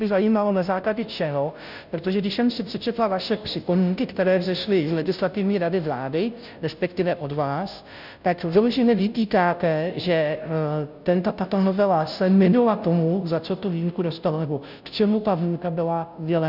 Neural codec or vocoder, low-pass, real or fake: codec, 16 kHz, 0.5 kbps, FunCodec, trained on Chinese and English, 25 frames a second; 5.4 kHz; fake